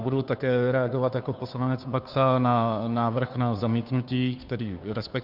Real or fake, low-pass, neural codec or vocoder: fake; 5.4 kHz; codec, 16 kHz, 2 kbps, FunCodec, trained on Chinese and English, 25 frames a second